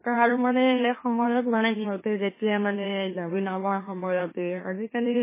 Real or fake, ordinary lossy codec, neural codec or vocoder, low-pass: fake; MP3, 16 kbps; autoencoder, 44.1 kHz, a latent of 192 numbers a frame, MeloTTS; 3.6 kHz